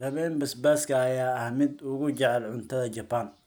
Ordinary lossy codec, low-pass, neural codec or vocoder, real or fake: none; none; none; real